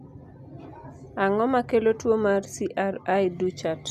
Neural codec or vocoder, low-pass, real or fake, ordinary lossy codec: none; 14.4 kHz; real; none